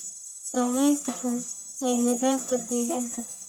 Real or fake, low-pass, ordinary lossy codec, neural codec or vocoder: fake; none; none; codec, 44.1 kHz, 1.7 kbps, Pupu-Codec